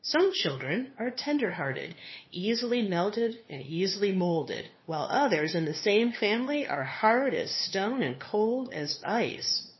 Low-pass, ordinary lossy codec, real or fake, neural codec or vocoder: 7.2 kHz; MP3, 24 kbps; fake; codec, 16 kHz, 2 kbps, FunCodec, trained on LibriTTS, 25 frames a second